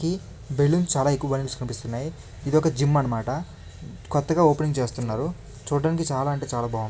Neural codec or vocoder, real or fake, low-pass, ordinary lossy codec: none; real; none; none